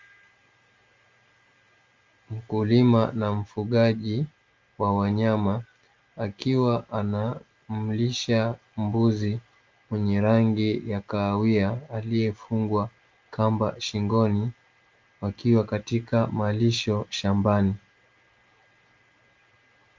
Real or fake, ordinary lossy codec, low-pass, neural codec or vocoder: real; Opus, 32 kbps; 7.2 kHz; none